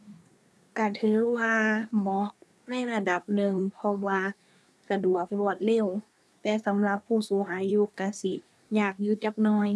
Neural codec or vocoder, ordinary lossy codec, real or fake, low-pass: codec, 24 kHz, 1 kbps, SNAC; none; fake; none